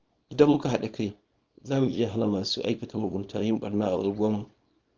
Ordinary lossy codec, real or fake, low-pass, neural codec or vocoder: Opus, 24 kbps; fake; 7.2 kHz; codec, 24 kHz, 0.9 kbps, WavTokenizer, small release